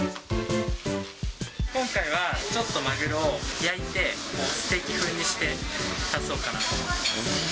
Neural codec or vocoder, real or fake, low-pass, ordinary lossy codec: none; real; none; none